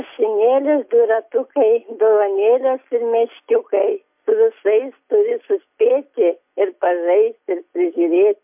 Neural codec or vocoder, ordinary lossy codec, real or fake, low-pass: none; MP3, 32 kbps; real; 3.6 kHz